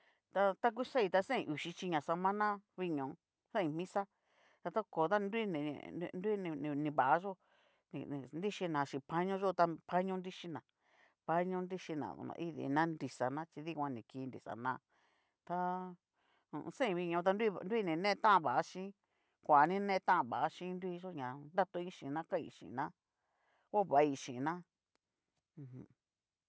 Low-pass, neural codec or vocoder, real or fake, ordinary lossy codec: none; none; real; none